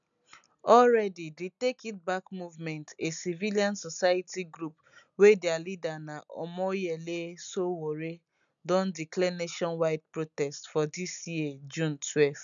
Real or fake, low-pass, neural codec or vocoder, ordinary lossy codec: real; 7.2 kHz; none; none